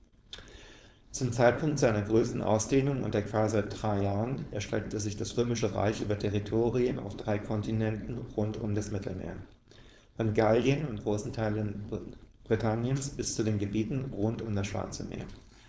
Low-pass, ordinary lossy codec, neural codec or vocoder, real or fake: none; none; codec, 16 kHz, 4.8 kbps, FACodec; fake